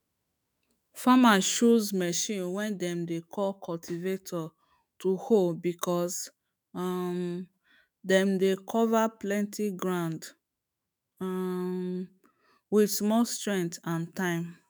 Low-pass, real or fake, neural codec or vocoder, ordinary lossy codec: none; fake; autoencoder, 48 kHz, 128 numbers a frame, DAC-VAE, trained on Japanese speech; none